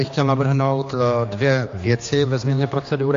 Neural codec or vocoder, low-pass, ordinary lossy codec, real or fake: codec, 16 kHz, 2 kbps, X-Codec, HuBERT features, trained on general audio; 7.2 kHz; AAC, 48 kbps; fake